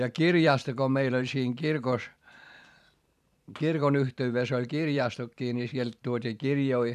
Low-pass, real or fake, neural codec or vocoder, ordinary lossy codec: 14.4 kHz; fake; vocoder, 44.1 kHz, 128 mel bands every 512 samples, BigVGAN v2; none